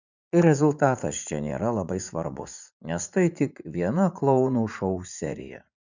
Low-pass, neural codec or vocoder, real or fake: 7.2 kHz; vocoder, 44.1 kHz, 80 mel bands, Vocos; fake